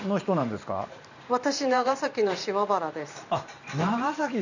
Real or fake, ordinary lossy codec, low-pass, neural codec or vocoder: fake; none; 7.2 kHz; vocoder, 44.1 kHz, 128 mel bands every 512 samples, BigVGAN v2